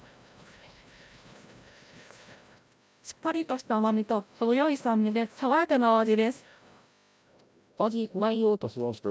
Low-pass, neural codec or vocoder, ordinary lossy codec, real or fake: none; codec, 16 kHz, 0.5 kbps, FreqCodec, larger model; none; fake